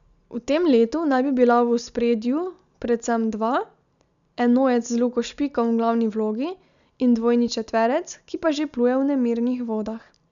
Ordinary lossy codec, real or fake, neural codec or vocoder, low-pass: none; real; none; 7.2 kHz